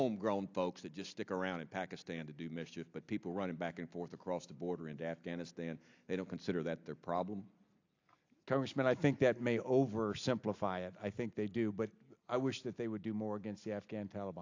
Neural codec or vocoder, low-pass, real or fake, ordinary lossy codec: none; 7.2 kHz; real; AAC, 48 kbps